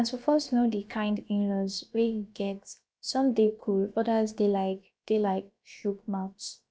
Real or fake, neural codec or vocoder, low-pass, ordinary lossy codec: fake; codec, 16 kHz, about 1 kbps, DyCAST, with the encoder's durations; none; none